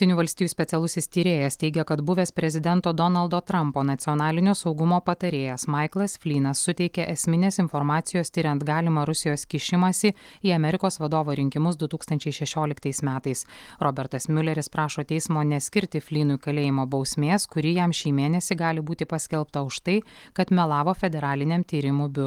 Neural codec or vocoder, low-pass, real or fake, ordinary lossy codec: none; 19.8 kHz; real; Opus, 24 kbps